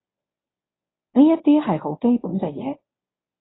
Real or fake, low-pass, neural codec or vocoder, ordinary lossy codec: fake; 7.2 kHz; codec, 24 kHz, 0.9 kbps, WavTokenizer, medium speech release version 1; AAC, 16 kbps